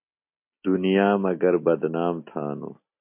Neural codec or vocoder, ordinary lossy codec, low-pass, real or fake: none; MP3, 32 kbps; 3.6 kHz; real